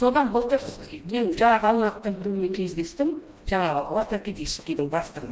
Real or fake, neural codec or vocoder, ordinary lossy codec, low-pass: fake; codec, 16 kHz, 1 kbps, FreqCodec, smaller model; none; none